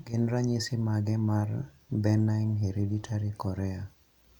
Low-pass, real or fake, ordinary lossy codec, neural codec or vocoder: 19.8 kHz; real; none; none